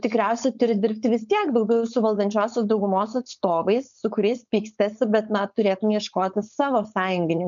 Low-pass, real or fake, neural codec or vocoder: 7.2 kHz; fake; codec, 16 kHz, 4.8 kbps, FACodec